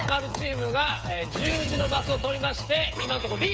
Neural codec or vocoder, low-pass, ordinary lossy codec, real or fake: codec, 16 kHz, 8 kbps, FreqCodec, smaller model; none; none; fake